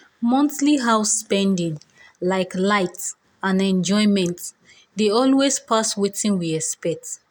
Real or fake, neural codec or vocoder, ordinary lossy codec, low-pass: real; none; none; none